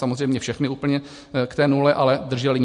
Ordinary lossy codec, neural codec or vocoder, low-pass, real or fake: MP3, 48 kbps; none; 14.4 kHz; real